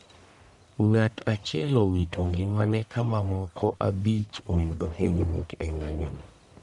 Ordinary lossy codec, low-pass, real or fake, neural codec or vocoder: none; 10.8 kHz; fake; codec, 44.1 kHz, 1.7 kbps, Pupu-Codec